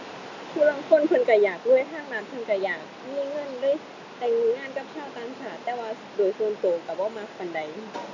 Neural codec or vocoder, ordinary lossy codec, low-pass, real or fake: none; none; 7.2 kHz; real